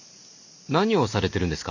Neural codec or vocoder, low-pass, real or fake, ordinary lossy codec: none; 7.2 kHz; real; none